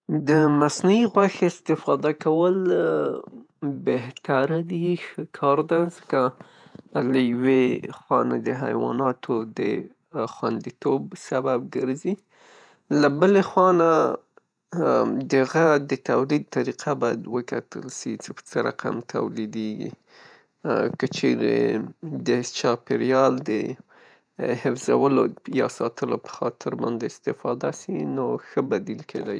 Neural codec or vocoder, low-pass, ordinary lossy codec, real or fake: vocoder, 48 kHz, 128 mel bands, Vocos; 9.9 kHz; none; fake